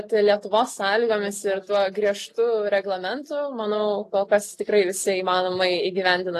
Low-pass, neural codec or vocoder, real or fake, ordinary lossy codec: 14.4 kHz; none; real; AAC, 48 kbps